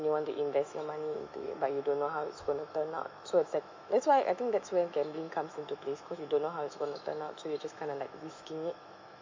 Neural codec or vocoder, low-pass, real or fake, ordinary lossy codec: autoencoder, 48 kHz, 128 numbers a frame, DAC-VAE, trained on Japanese speech; 7.2 kHz; fake; MP3, 64 kbps